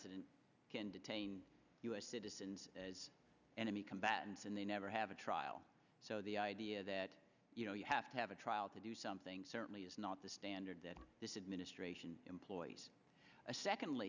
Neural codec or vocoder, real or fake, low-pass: none; real; 7.2 kHz